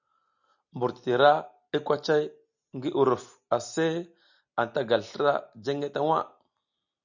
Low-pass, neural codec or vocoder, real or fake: 7.2 kHz; none; real